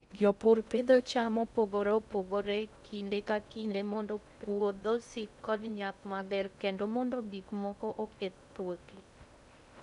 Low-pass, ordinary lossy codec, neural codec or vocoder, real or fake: 10.8 kHz; none; codec, 16 kHz in and 24 kHz out, 0.6 kbps, FocalCodec, streaming, 2048 codes; fake